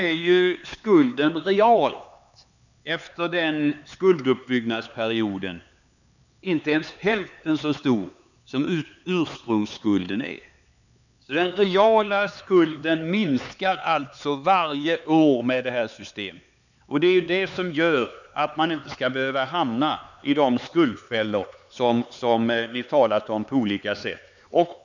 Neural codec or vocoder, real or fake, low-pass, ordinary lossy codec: codec, 16 kHz, 4 kbps, X-Codec, HuBERT features, trained on LibriSpeech; fake; 7.2 kHz; none